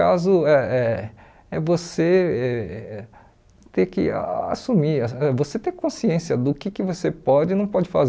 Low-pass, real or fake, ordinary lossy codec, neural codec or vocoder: none; real; none; none